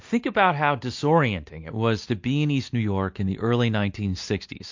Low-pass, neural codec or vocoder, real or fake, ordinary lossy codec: 7.2 kHz; none; real; MP3, 48 kbps